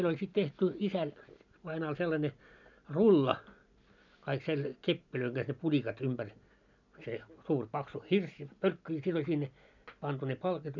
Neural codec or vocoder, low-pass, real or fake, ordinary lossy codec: none; 7.2 kHz; real; none